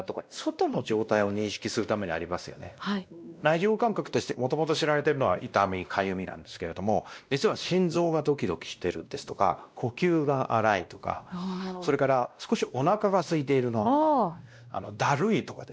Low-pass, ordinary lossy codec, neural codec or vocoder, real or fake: none; none; codec, 16 kHz, 1 kbps, X-Codec, WavLM features, trained on Multilingual LibriSpeech; fake